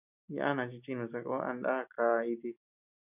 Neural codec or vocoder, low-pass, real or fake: none; 3.6 kHz; real